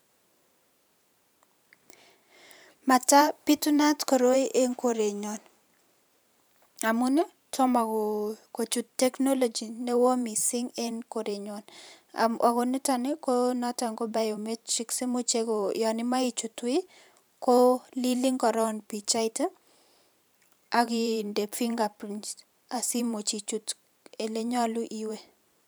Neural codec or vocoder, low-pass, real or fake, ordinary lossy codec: vocoder, 44.1 kHz, 128 mel bands every 512 samples, BigVGAN v2; none; fake; none